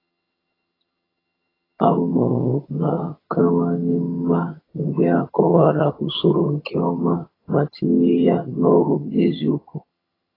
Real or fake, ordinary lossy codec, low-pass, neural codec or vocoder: fake; AAC, 24 kbps; 5.4 kHz; vocoder, 22.05 kHz, 80 mel bands, HiFi-GAN